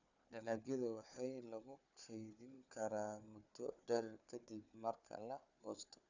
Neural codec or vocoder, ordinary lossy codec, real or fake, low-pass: codec, 16 kHz, 4 kbps, FunCodec, trained on Chinese and English, 50 frames a second; none; fake; 7.2 kHz